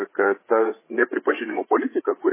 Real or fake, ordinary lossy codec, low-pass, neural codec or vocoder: fake; MP3, 16 kbps; 3.6 kHz; codec, 16 kHz, 8 kbps, FreqCodec, larger model